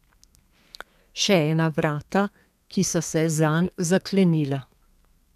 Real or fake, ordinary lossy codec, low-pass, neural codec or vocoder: fake; none; 14.4 kHz; codec, 32 kHz, 1.9 kbps, SNAC